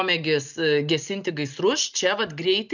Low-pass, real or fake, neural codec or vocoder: 7.2 kHz; real; none